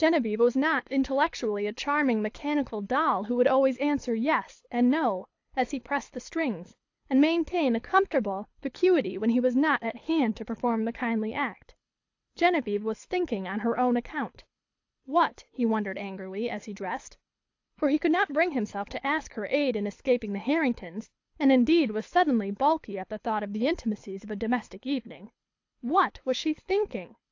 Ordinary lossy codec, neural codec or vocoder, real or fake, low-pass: AAC, 48 kbps; codec, 24 kHz, 6 kbps, HILCodec; fake; 7.2 kHz